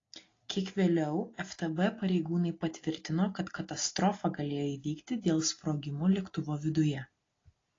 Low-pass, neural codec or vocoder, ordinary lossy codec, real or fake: 7.2 kHz; none; AAC, 32 kbps; real